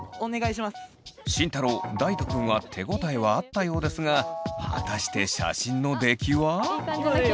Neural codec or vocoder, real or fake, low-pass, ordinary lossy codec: none; real; none; none